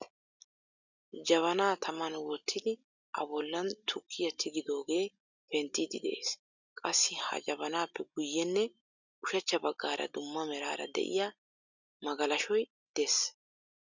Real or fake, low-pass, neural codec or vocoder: real; 7.2 kHz; none